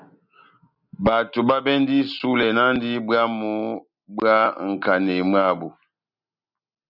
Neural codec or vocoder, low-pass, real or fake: none; 5.4 kHz; real